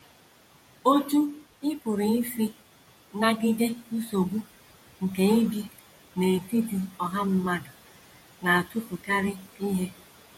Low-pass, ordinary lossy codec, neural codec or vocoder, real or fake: 19.8 kHz; MP3, 64 kbps; vocoder, 48 kHz, 128 mel bands, Vocos; fake